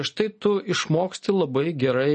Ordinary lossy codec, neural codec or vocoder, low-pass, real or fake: MP3, 32 kbps; none; 10.8 kHz; real